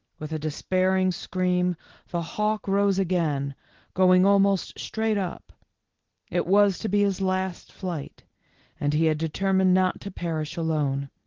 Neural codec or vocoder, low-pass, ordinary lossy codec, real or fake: none; 7.2 kHz; Opus, 16 kbps; real